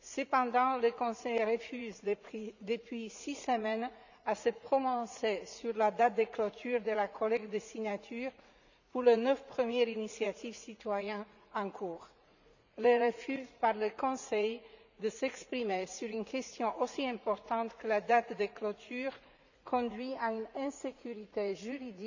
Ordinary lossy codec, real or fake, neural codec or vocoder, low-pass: none; fake; vocoder, 44.1 kHz, 80 mel bands, Vocos; 7.2 kHz